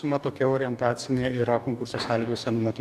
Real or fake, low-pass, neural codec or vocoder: fake; 14.4 kHz; codec, 44.1 kHz, 2.6 kbps, DAC